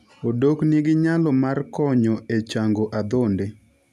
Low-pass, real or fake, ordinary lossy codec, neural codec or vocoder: 14.4 kHz; real; none; none